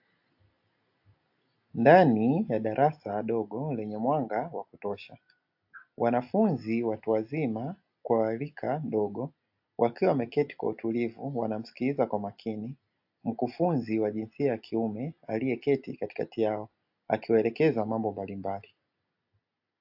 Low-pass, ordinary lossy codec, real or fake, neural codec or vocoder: 5.4 kHz; AAC, 48 kbps; real; none